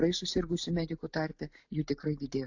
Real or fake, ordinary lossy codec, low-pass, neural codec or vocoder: real; AAC, 48 kbps; 7.2 kHz; none